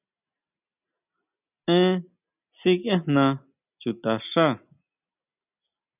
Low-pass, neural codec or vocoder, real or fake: 3.6 kHz; none; real